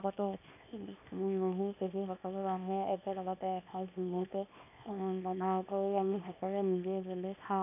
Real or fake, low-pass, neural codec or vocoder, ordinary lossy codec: fake; 3.6 kHz; codec, 24 kHz, 0.9 kbps, WavTokenizer, small release; none